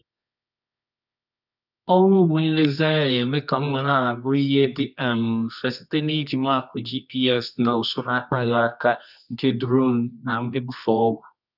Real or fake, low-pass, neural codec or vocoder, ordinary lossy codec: fake; 5.4 kHz; codec, 24 kHz, 0.9 kbps, WavTokenizer, medium music audio release; none